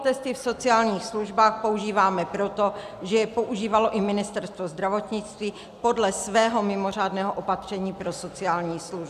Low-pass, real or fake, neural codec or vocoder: 14.4 kHz; real; none